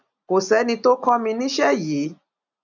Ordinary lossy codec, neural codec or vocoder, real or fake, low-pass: none; none; real; 7.2 kHz